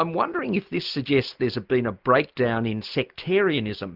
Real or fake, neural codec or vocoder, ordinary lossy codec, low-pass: real; none; Opus, 32 kbps; 5.4 kHz